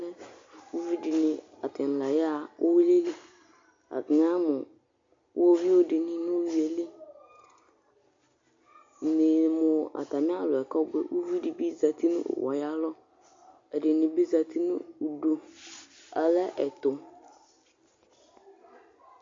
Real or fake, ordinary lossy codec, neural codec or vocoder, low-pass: real; MP3, 48 kbps; none; 7.2 kHz